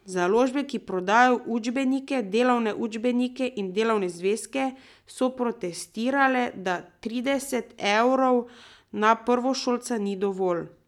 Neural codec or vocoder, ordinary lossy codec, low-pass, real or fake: none; none; 19.8 kHz; real